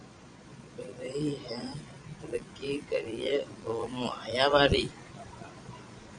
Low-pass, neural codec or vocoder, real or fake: 9.9 kHz; vocoder, 22.05 kHz, 80 mel bands, Vocos; fake